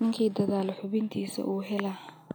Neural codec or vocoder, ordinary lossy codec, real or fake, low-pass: none; none; real; none